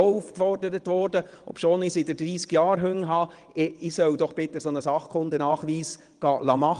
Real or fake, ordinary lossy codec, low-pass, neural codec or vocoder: fake; Opus, 24 kbps; 9.9 kHz; vocoder, 22.05 kHz, 80 mel bands, WaveNeXt